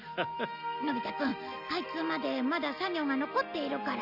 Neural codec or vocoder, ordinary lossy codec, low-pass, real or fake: none; none; 5.4 kHz; real